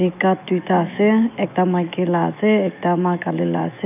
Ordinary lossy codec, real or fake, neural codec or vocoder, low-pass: none; fake; autoencoder, 48 kHz, 128 numbers a frame, DAC-VAE, trained on Japanese speech; 3.6 kHz